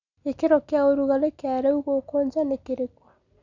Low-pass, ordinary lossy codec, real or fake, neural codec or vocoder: 7.2 kHz; none; real; none